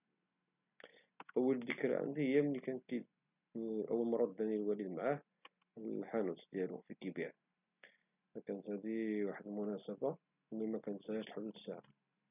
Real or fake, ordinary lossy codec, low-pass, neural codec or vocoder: real; none; 3.6 kHz; none